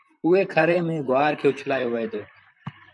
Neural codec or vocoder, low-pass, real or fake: vocoder, 44.1 kHz, 128 mel bands, Pupu-Vocoder; 10.8 kHz; fake